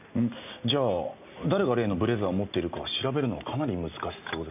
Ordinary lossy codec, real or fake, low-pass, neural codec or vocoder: none; real; 3.6 kHz; none